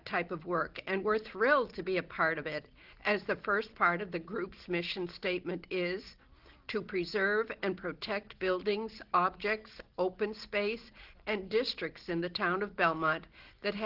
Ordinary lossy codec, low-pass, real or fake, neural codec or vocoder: Opus, 16 kbps; 5.4 kHz; real; none